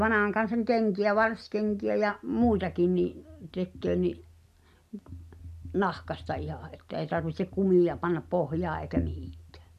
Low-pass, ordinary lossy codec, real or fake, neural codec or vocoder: 14.4 kHz; none; real; none